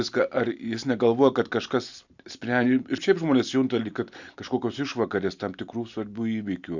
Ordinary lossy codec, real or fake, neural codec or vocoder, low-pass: Opus, 64 kbps; real; none; 7.2 kHz